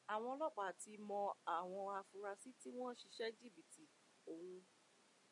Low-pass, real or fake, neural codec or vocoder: 10.8 kHz; real; none